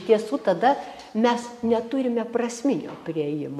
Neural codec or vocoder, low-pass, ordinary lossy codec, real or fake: none; 14.4 kHz; AAC, 96 kbps; real